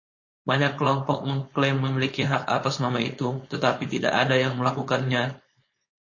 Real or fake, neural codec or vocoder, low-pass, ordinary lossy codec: fake; codec, 16 kHz, 4.8 kbps, FACodec; 7.2 kHz; MP3, 32 kbps